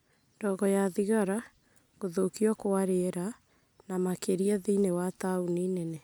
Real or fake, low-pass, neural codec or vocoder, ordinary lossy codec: real; none; none; none